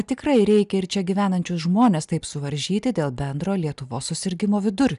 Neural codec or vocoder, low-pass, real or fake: none; 10.8 kHz; real